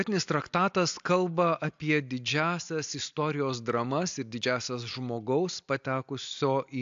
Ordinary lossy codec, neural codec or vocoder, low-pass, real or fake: AAC, 64 kbps; none; 7.2 kHz; real